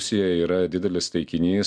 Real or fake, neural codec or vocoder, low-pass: real; none; 9.9 kHz